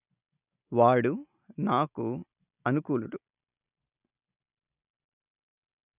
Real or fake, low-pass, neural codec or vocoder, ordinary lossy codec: real; 3.6 kHz; none; none